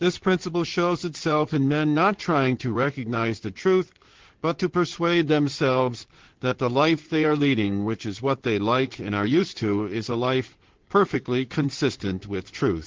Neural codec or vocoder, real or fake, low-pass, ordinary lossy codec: codec, 16 kHz in and 24 kHz out, 2.2 kbps, FireRedTTS-2 codec; fake; 7.2 kHz; Opus, 16 kbps